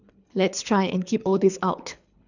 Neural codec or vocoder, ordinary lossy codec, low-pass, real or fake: codec, 24 kHz, 3 kbps, HILCodec; none; 7.2 kHz; fake